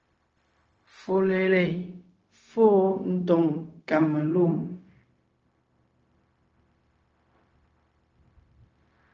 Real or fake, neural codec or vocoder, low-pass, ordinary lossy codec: fake; codec, 16 kHz, 0.4 kbps, LongCat-Audio-Codec; 7.2 kHz; Opus, 24 kbps